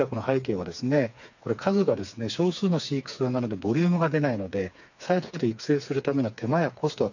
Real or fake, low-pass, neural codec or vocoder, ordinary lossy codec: fake; 7.2 kHz; codec, 16 kHz, 4 kbps, FreqCodec, smaller model; none